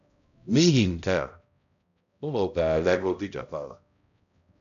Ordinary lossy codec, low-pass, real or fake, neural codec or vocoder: none; 7.2 kHz; fake; codec, 16 kHz, 0.5 kbps, X-Codec, HuBERT features, trained on balanced general audio